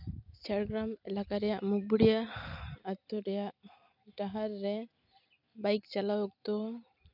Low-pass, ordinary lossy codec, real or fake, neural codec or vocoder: 5.4 kHz; none; real; none